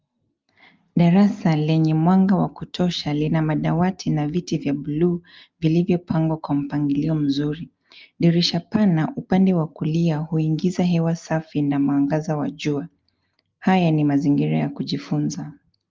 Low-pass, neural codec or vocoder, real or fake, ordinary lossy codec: 7.2 kHz; none; real; Opus, 32 kbps